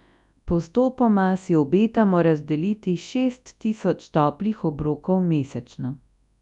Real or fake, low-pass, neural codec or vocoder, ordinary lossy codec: fake; 10.8 kHz; codec, 24 kHz, 0.9 kbps, WavTokenizer, large speech release; none